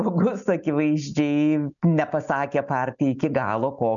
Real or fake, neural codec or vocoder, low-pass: real; none; 7.2 kHz